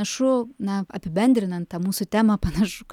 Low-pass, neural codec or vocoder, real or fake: 19.8 kHz; none; real